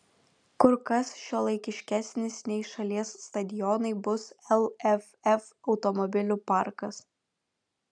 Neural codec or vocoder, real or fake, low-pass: none; real; 9.9 kHz